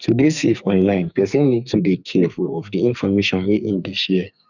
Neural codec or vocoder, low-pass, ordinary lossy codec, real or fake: codec, 44.1 kHz, 2.6 kbps, SNAC; 7.2 kHz; none; fake